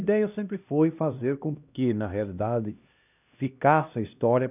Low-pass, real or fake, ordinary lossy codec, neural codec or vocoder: 3.6 kHz; fake; none; codec, 16 kHz, 1 kbps, X-Codec, HuBERT features, trained on LibriSpeech